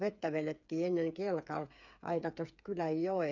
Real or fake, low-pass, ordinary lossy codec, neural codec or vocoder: fake; 7.2 kHz; none; codec, 16 kHz, 8 kbps, FreqCodec, smaller model